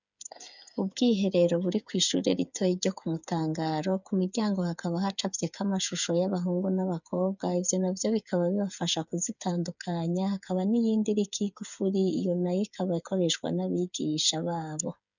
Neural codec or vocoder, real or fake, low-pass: codec, 16 kHz, 8 kbps, FreqCodec, smaller model; fake; 7.2 kHz